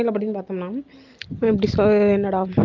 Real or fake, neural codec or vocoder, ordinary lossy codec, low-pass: real; none; Opus, 32 kbps; 7.2 kHz